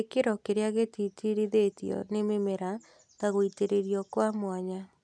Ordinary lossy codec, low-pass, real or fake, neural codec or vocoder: none; none; real; none